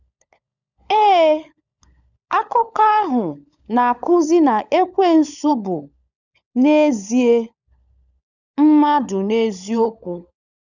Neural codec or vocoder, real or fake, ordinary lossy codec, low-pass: codec, 16 kHz, 16 kbps, FunCodec, trained on LibriTTS, 50 frames a second; fake; none; 7.2 kHz